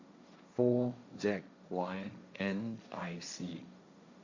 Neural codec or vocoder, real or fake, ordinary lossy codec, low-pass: codec, 16 kHz, 1.1 kbps, Voila-Tokenizer; fake; Opus, 64 kbps; 7.2 kHz